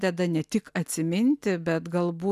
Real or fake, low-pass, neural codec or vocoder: real; 14.4 kHz; none